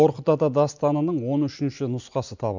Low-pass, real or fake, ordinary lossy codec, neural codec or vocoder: 7.2 kHz; real; none; none